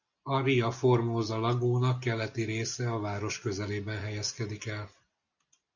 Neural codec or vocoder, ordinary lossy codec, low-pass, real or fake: none; Opus, 64 kbps; 7.2 kHz; real